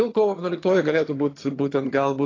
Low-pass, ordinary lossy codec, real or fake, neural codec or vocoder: 7.2 kHz; AAC, 32 kbps; fake; vocoder, 22.05 kHz, 80 mel bands, HiFi-GAN